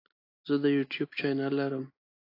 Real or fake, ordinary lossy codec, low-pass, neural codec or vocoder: real; AAC, 32 kbps; 5.4 kHz; none